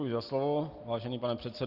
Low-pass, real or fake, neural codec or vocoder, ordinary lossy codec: 5.4 kHz; real; none; Opus, 24 kbps